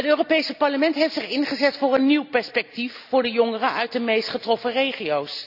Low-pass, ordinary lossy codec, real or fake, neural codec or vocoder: 5.4 kHz; none; real; none